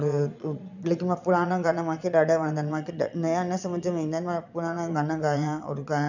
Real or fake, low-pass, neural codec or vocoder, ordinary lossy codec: fake; 7.2 kHz; vocoder, 22.05 kHz, 80 mel bands, Vocos; none